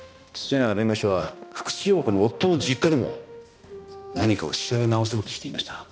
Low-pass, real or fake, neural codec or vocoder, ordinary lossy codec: none; fake; codec, 16 kHz, 1 kbps, X-Codec, HuBERT features, trained on balanced general audio; none